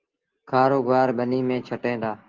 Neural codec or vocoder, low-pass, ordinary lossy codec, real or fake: none; 7.2 kHz; Opus, 16 kbps; real